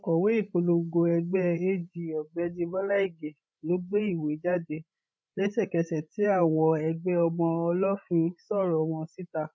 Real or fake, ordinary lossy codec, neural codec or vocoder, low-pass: fake; none; codec, 16 kHz, 16 kbps, FreqCodec, larger model; none